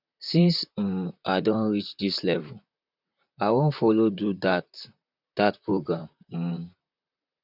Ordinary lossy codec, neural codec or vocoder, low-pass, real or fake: none; vocoder, 44.1 kHz, 128 mel bands, Pupu-Vocoder; 5.4 kHz; fake